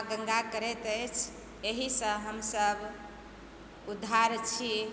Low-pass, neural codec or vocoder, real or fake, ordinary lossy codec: none; none; real; none